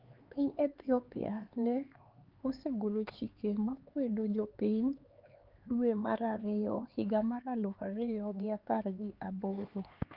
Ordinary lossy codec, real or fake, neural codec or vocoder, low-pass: Opus, 32 kbps; fake; codec, 16 kHz, 4 kbps, X-Codec, HuBERT features, trained on LibriSpeech; 5.4 kHz